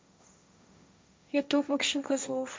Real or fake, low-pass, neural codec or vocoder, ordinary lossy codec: fake; none; codec, 16 kHz, 1.1 kbps, Voila-Tokenizer; none